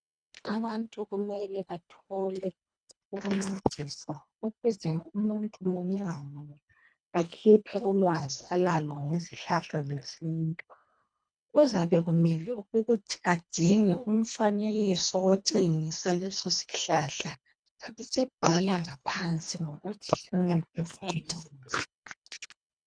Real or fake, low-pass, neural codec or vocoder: fake; 9.9 kHz; codec, 24 kHz, 1.5 kbps, HILCodec